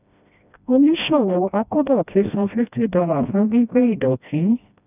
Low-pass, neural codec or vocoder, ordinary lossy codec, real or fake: 3.6 kHz; codec, 16 kHz, 1 kbps, FreqCodec, smaller model; none; fake